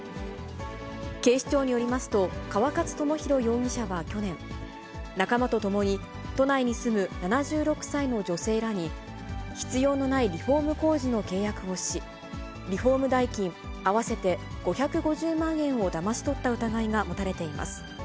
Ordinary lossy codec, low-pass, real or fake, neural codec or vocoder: none; none; real; none